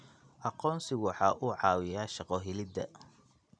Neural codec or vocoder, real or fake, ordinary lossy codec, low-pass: none; real; none; 9.9 kHz